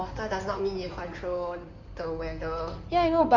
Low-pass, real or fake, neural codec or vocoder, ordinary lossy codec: 7.2 kHz; fake; codec, 16 kHz in and 24 kHz out, 2.2 kbps, FireRedTTS-2 codec; none